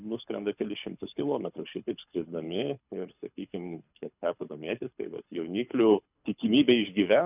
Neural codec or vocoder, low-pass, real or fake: codec, 24 kHz, 6 kbps, HILCodec; 3.6 kHz; fake